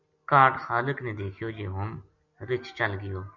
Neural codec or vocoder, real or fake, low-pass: none; real; 7.2 kHz